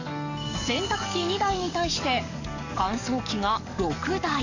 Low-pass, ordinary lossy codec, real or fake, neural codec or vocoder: 7.2 kHz; none; fake; codec, 44.1 kHz, 7.8 kbps, DAC